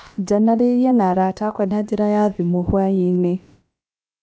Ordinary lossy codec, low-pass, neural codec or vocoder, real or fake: none; none; codec, 16 kHz, about 1 kbps, DyCAST, with the encoder's durations; fake